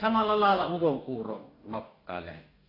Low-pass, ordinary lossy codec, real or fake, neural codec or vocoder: 5.4 kHz; AAC, 24 kbps; fake; codec, 44.1 kHz, 2.6 kbps, DAC